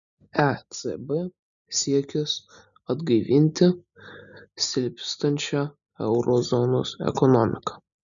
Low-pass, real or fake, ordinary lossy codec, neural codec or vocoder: 7.2 kHz; real; MP3, 96 kbps; none